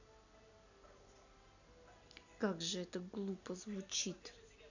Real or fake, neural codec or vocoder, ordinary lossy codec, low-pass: real; none; none; 7.2 kHz